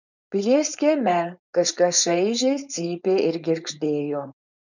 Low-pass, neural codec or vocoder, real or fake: 7.2 kHz; codec, 16 kHz, 4.8 kbps, FACodec; fake